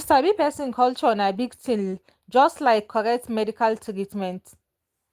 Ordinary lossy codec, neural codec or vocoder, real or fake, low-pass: Opus, 24 kbps; none; real; 14.4 kHz